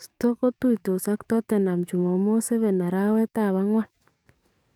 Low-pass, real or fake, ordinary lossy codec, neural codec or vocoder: 19.8 kHz; fake; none; codec, 44.1 kHz, 7.8 kbps, DAC